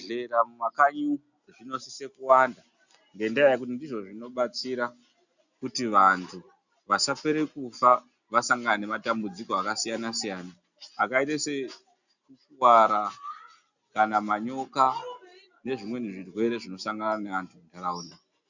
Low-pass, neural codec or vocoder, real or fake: 7.2 kHz; none; real